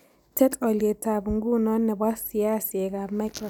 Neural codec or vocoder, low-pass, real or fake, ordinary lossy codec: none; none; real; none